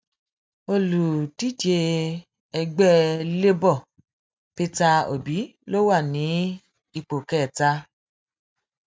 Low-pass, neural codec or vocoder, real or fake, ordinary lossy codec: none; none; real; none